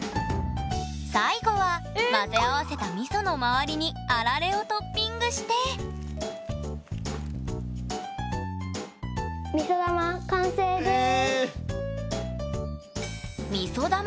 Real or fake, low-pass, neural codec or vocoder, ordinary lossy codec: real; none; none; none